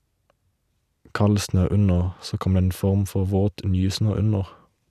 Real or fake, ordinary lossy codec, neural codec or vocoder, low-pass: real; none; none; 14.4 kHz